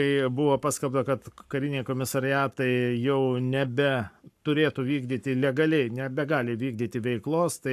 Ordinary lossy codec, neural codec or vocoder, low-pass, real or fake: AAC, 96 kbps; codec, 44.1 kHz, 7.8 kbps, Pupu-Codec; 14.4 kHz; fake